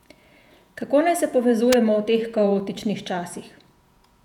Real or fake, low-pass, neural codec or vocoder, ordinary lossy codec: fake; 19.8 kHz; vocoder, 48 kHz, 128 mel bands, Vocos; none